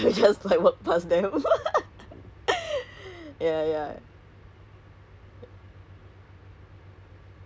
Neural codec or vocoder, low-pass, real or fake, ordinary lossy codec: none; none; real; none